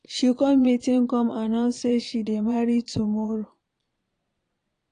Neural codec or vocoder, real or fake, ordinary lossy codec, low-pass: vocoder, 22.05 kHz, 80 mel bands, Vocos; fake; AAC, 48 kbps; 9.9 kHz